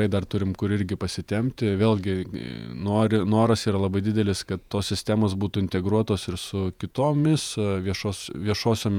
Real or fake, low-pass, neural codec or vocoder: fake; 19.8 kHz; vocoder, 48 kHz, 128 mel bands, Vocos